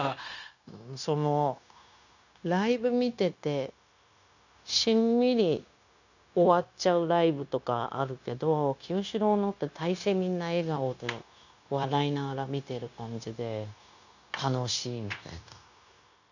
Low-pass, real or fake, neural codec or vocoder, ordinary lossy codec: 7.2 kHz; fake; codec, 16 kHz, 0.9 kbps, LongCat-Audio-Codec; none